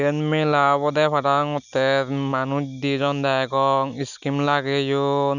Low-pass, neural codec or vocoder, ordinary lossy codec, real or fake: 7.2 kHz; none; none; real